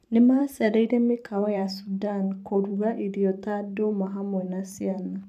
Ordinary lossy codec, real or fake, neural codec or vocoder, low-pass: none; fake; vocoder, 44.1 kHz, 128 mel bands every 512 samples, BigVGAN v2; 14.4 kHz